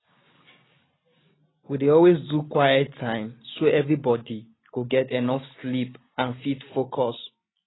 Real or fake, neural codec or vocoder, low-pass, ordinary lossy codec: real; none; 7.2 kHz; AAC, 16 kbps